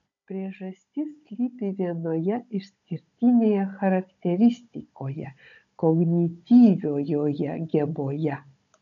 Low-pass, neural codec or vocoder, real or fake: 7.2 kHz; codec, 16 kHz, 16 kbps, FunCodec, trained on Chinese and English, 50 frames a second; fake